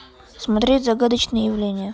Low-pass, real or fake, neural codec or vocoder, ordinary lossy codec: none; real; none; none